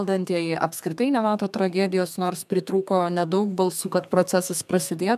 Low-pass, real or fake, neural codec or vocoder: 14.4 kHz; fake; codec, 32 kHz, 1.9 kbps, SNAC